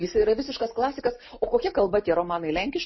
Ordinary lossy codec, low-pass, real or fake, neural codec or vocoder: MP3, 24 kbps; 7.2 kHz; real; none